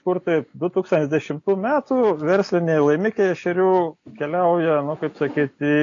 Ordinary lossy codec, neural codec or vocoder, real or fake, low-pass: AAC, 48 kbps; none; real; 7.2 kHz